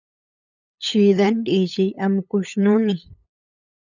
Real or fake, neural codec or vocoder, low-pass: fake; codec, 16 kHz, 8 kbps, FunCodec, trained on LibriTTS, 25 frames a second; 7.2 kHz